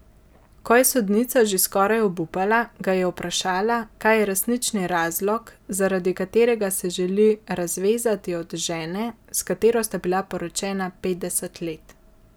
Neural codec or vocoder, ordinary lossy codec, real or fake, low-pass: none; none; real; none